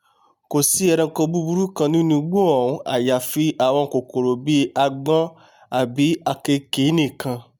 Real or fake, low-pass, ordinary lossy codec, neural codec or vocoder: real; none; none; none